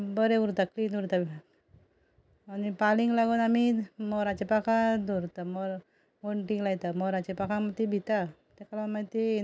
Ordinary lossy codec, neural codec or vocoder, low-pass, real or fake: none; none; none; real